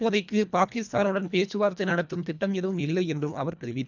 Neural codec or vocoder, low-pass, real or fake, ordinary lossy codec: codec, 24 kHz, 1.5 kbps, HILCodec; 7.2 kHz; fake; none